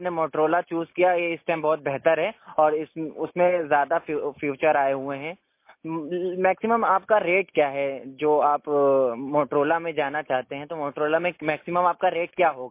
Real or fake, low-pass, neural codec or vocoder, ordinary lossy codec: real; 3.6 kHz; none; MP3, 24 kbps